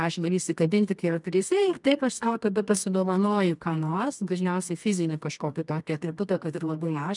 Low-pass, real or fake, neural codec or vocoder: 10.8 kHz; fake; codec, 24 kHz, 0.9 kbps, WavTokenizer, medium music audio release